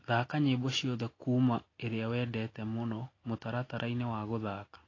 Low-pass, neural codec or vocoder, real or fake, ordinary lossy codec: 7.2 kHz; none; real; AAC, 32 kbps